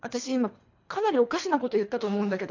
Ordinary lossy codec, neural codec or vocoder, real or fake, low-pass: MP3, 48 kbps; codec, 24 kHz, 3 kbps, HILCodec; fake; 7.2 kHz